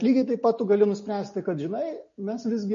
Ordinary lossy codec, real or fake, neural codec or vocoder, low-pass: MP3, 32 kbps; real; none; 7.2 kHz